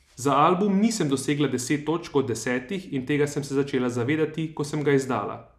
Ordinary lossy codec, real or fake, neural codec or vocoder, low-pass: none; real; none; 14.4 kHz